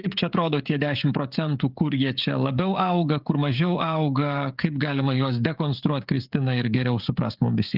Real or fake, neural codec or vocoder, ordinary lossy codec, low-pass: fake; codec, 16 kHz, 16 kbps, FreqCodec, smaller model; Opus, 24 kbps; 5.4 kHz